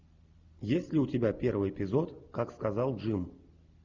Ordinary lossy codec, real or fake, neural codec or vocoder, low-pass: Opus, 64 kbps; real; none; 7.2 kHz